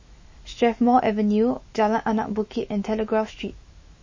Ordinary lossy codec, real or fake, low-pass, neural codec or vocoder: MP3, 32 kbps; fake; 7.2 kHz; autoencoder, 48 kHz, 128 numbers a frame, DAC-VAE, trained on Japanese speech